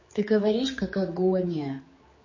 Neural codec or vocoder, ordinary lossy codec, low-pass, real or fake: codec, 16 kHz, 4 kbps, X-Codec, HuBERT features, trained on general audio; MP3, 32 kbps; 7.2 kHz; fake